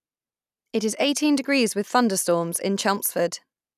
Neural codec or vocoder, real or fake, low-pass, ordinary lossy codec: none; real; 14.4 kHz; none